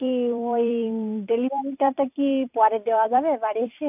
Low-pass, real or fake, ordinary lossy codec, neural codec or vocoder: 3.6 kHz; fake; AAC, 32 kbps; vocoder, 44.1 kHz, 128 mel bands every 256 samples, BigVGAN v2